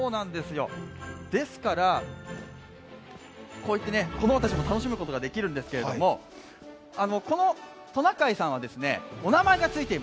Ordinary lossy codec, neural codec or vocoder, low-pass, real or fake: none; none; none; real